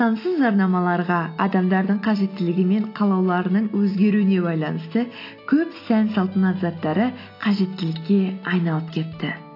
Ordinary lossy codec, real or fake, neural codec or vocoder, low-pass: AAC, 32 kbps; real; none; 5.4 kHz